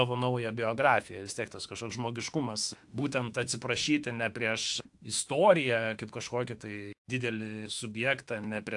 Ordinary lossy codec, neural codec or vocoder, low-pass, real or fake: AAC, 64 kbps; autoencoder, 48 kHz, 32 numbers a frame, DAC-VAE, trained on Japanese speech; 10.8 kHz; fake